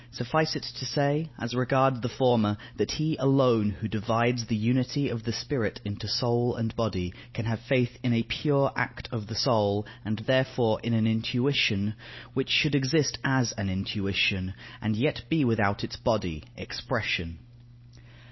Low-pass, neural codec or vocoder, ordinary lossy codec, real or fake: 7.2 kHz; none; MP3, 24 kbps; real